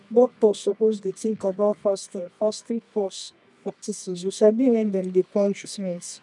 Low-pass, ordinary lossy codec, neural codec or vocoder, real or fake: 10.8 kHz; none; codec, 24 kHz, 0.9 kbps, WavTokenizer, medium music audio release; fake